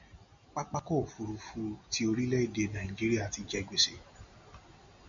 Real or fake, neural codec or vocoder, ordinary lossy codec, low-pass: real; none; MP3, 64 kbps; 7.2 kHz